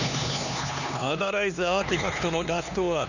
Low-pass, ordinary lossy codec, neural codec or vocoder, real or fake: 7.2 kHz; none; codec, 16 kHz, 2 kbps, X-Codec, HuBERT features, trained on LibriSpeech; fake